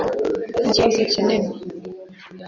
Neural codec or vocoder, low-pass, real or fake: vocoder, 24 kHz, 100 mel bands, Vocos; 7.2 kHz; fake